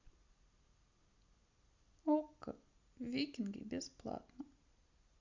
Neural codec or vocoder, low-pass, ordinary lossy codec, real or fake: none; 7.2 kHz; Opus, 64 kbps; real